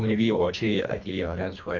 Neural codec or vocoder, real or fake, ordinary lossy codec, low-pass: codec, 24 kHz, 1.5 kbps, HILCodec; fake; AAC, 48 kbps; 7.2 kHz